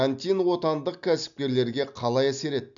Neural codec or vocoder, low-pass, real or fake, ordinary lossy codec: none; 7.2 kHz; real; none